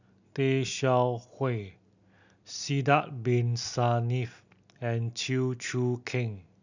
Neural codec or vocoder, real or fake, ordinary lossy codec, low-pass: none; real; none; 7.2 kHz